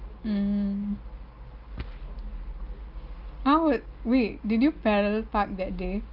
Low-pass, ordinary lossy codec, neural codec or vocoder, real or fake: 5.4 kHz; Opus, 32 kbps; none; real